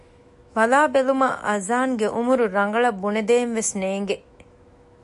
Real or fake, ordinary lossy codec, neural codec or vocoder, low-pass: fake; MP3, 48 kbps; autoencoder, 48 kHz, 128 numbers a frame, DAC-VAE, trained on Japanese speech; 14.4 kHz